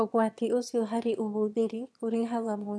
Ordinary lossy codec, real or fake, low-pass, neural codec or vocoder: none; fake; none; autoencoder, 22.05 kHz, a latent of 192 numbers a frame, VITS, trained on one speaker